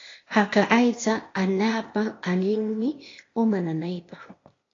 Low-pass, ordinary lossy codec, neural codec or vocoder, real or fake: 7.2 kHz; AAC, 32 kbps; codec, 16 kHz, 0.8 kbps, ZipCodec; fake